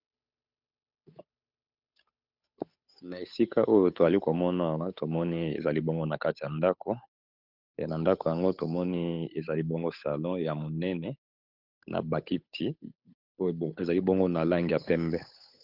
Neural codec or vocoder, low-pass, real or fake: codec, 16 kHz, 8 kbps, FunCodec, trained on Chinese and English, 25 frames a second; 5.4 kHz; fake